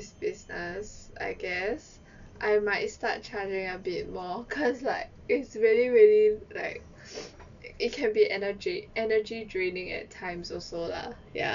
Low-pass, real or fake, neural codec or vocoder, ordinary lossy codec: 7.2 kHz; real; none; MP3, 96 kbps